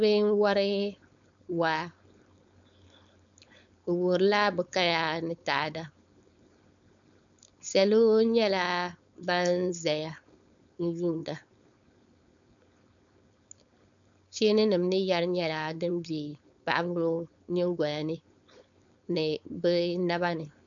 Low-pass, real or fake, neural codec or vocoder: 7.2 kHz; fake; codec, 16 kHz, 4.8 kbps, FACodec